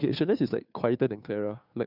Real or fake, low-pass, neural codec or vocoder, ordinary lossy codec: fake; 5.4 kHz; codec, 16 kHz, 2 kbps, FunCodec, trained on Chinese and English, 25 frames a second; none